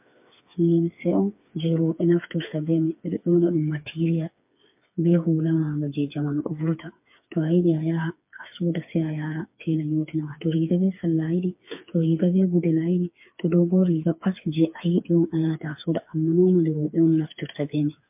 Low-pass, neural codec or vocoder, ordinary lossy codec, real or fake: 3.6 kHz; codec, 16 kHz, 4 kbps, FreqCodec, smaller model; MP3, 32 kbps; fake